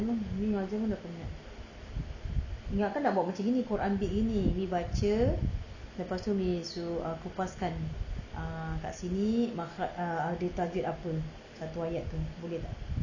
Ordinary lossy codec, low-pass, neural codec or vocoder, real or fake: none; 7.2 kHz; none; real